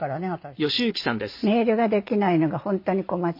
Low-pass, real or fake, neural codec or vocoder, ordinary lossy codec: 5.4 kHz; real; none; MP3, 32 kbps